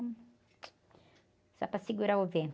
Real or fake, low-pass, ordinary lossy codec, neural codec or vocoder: real; none; none; none